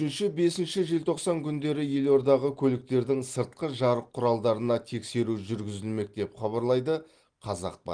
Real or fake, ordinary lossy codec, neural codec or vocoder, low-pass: real; Opus, 24 kbps; none; 9.9 kHz